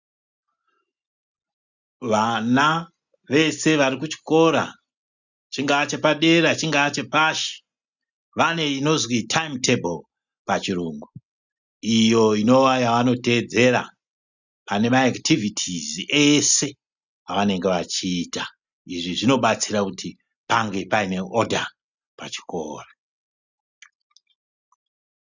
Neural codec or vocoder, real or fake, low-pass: none; real; 7.2 kHz